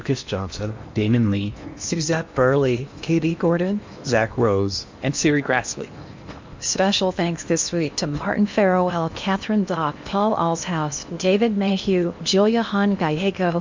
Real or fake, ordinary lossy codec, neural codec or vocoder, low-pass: fake; MP3, 64 kbps; codec, 16 kHz in and 24 kHz out, 0.8 kbps, FocalCodec, streaming, 65536 codes; 7.2 kHz